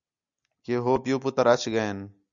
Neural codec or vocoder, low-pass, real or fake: none; 7.2 kHz; real